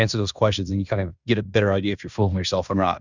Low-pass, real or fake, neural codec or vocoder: 7.2 kHz; fake; codec, 16 kHz in and 24 kHz out, 0.9 kbps, LongCat-Audio-Codec, fine tuned four codebook decoder